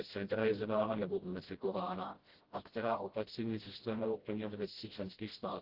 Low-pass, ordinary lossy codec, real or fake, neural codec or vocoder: 5.4 kHz; Opus, 16 kbps; fake; codec, 16 kHz, 0.5 kbps, FreqCodec, smaller model